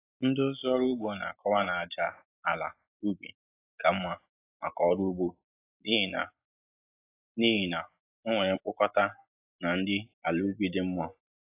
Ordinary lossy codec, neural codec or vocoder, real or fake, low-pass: AAC, 24 kbps; none; real; 3.6 kHz